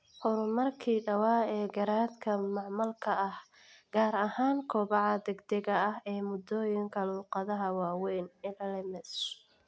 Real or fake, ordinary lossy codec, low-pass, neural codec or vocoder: real; none; none; none